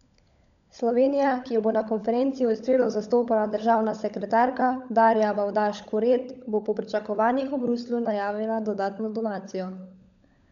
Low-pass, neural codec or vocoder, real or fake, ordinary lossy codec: 7.2 kHz; codec, 16 kHz, 16 kbps, FunCodec, trained on LibriTTS, 50 frames a second; fake; none